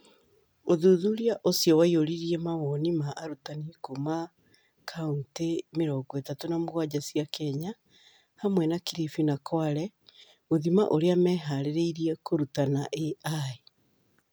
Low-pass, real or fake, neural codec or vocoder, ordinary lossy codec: none; real; none; none